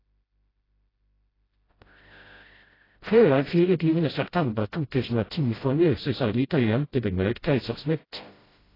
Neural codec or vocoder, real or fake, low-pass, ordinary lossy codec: codec, 16 kHz, 0.5 kbps, FreqCodec, smaller model; fake; 5.4 kHz; AAC, 24 kbps